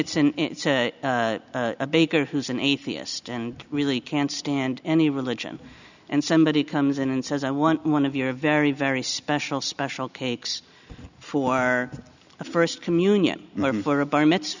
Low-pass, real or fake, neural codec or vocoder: 7.2 kHz; real; none